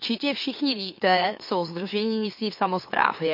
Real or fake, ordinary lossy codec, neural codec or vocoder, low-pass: fake; MP3, 32 kbps; autoencoder, 44.1 kHz, a latent of 192 numbers a frame, MeloTTS; 5.4 kHz